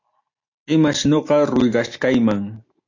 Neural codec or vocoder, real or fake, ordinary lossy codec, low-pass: none; real; AAC, 48 kbps; 7.2 kHz